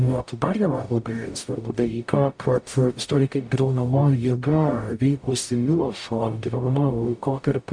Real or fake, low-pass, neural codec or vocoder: fake; 9.9 kHz; codec, 44.1 kHz, 0.9 kbps, DAC